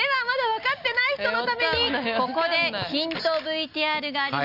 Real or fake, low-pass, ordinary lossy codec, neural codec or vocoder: real; 5.4 kHz; none; none